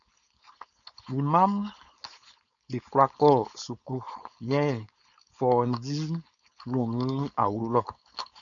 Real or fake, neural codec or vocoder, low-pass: fake; codec, 16 kHz, 4.8 kbps, FACodec; 7.2 kHz